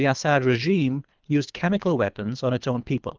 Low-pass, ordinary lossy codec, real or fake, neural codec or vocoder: 7.2 kHz; Opus, 24 kbps; fake; codec, 24 kHz, 3 kbps, HILCodec